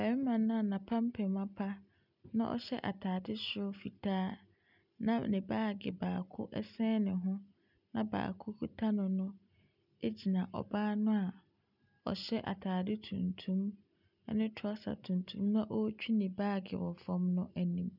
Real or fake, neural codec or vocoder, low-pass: real; none; 5.4 kHz